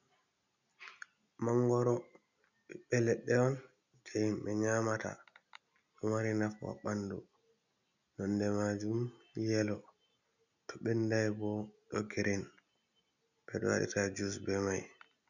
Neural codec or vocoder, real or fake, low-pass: none; real; 7.2 kHz